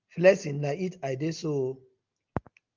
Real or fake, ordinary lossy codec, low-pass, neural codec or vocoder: real; Opus, 32 kbps; 7.2 kHz; none